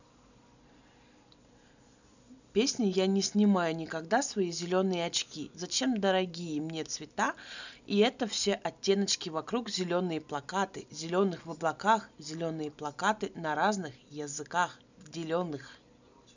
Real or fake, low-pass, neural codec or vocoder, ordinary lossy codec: real; 7.2 kHz; none; none